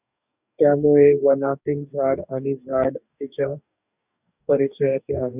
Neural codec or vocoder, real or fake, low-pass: codec, 44.1 kHz, 2.6 kbps, DAC; fake; 3.6 kHz